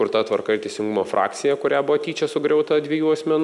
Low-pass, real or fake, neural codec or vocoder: 10.8 kHz; real; none